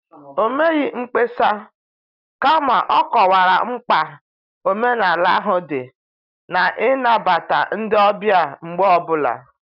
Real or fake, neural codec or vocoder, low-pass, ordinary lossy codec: real; none; 5.4 kHz; none